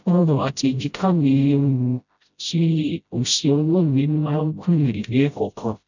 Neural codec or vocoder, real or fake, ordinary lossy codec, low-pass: codec, 16 kHz, 0.5 kbps, FreqCodec, smaller model; fake; none; 7.2 kHz